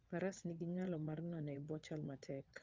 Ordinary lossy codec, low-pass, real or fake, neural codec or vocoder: Opus, 24 kbps; 7.2 kHz; fake; vocoder, 22.05 kHz, 80 mel bands, WaveNeXt